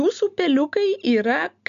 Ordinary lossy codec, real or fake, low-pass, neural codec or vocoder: MP3, 96 kbps; real; 7.2 kHz; none